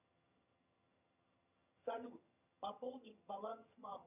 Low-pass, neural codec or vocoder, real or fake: 3.6 kHz; vocoder, 22.05 kHz, 80 mel bands, HiFi-GAN; fake